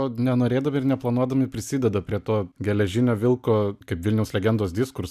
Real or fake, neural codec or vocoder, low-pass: real; none; 14.4 kHz